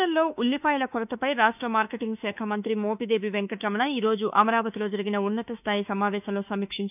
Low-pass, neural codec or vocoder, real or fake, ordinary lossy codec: 3.6 kHz; autoencoder, 48 kHz, 32 numbers a frame, DAC-VAE, trained on Japanese speech; fake; none